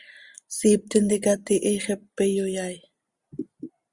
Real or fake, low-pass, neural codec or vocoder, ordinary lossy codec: real; 10.8 kHz; none; Opus, 64 kbps